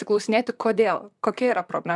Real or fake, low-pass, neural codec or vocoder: fake; 10.8 kHz; vocoder, 44.1 kHz, 128 mel bands, Pupu-Vocoder